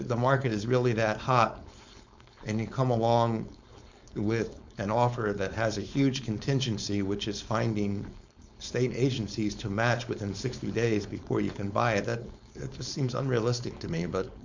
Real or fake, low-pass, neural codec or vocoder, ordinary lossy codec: fake; 7.2 kHz; codec, 16 kHz, 4.8 kbps, FACodec; MP3, 64 kbps